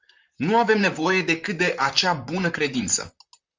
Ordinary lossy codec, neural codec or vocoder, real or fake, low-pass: Opus, 32 kbps; vocoder, 44.1 kHz, 128 mel bands, Pupu-Vocoder; fake; 7.2 kHz